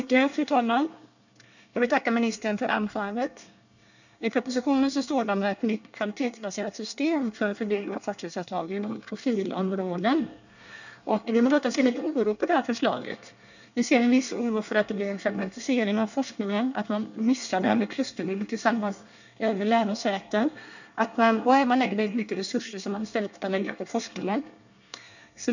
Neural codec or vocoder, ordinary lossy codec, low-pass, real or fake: codec, 24 kHz, 1 kbps, SNAC; none; 7.2 kHz; fake